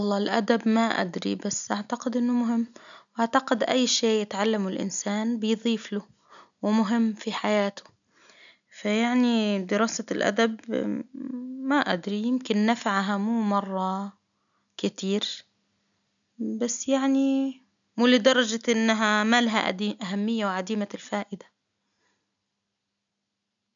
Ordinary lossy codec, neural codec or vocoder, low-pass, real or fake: none; none; 7.2 kHz; real